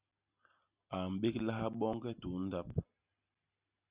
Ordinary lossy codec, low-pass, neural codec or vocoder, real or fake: Opus, 64 kbps; 3.6 kHz; none; real